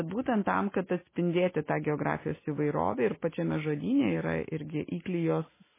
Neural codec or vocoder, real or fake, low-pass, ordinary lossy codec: none; real; 3.6 kHz; MP3, 16 kbps